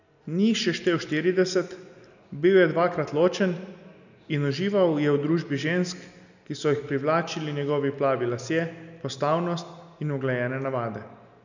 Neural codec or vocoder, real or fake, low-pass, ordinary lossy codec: none; real; 7.2 kHz; none